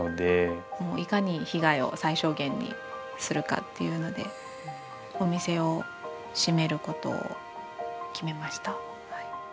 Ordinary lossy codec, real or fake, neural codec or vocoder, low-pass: none; real; none; none